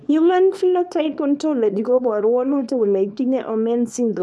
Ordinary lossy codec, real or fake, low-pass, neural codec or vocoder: none; fake; none; codec, 24 kHz, 0.9 kbps, WavTokenizer, small release